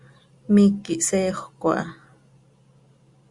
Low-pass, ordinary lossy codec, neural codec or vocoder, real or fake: 10.8 kHz; Opus, 64 kbps; none; real